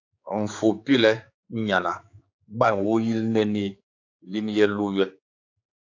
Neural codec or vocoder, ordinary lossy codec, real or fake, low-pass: codec, 16 kHz, 4 kbps, X-Codec, HuBERT features, trained on general audio; AAC, 48 kbps; fake; 7.2 kHz